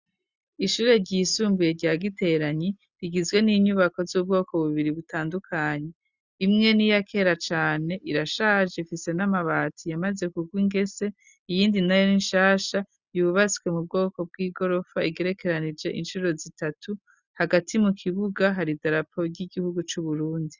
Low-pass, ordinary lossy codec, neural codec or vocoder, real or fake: 7.2 kHz; Opus, 64 kbps; none; real